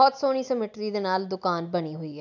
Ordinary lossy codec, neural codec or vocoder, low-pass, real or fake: none; none; 7.2 kHz; real